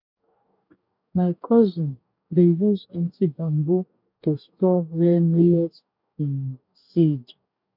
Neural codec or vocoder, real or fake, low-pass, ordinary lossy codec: codec, 44.1 kHz, 2.6 kbps, DAC; fake; 5.4 kHz; none